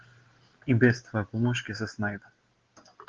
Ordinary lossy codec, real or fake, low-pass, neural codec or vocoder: Opus, 16 kbps; real; 7.2 kHz; none